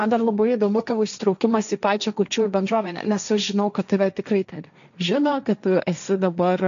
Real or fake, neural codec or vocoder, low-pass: fake; codec, 16 kHz, 1.1 kbps, Voila-Tokenizer; 7.2 kHz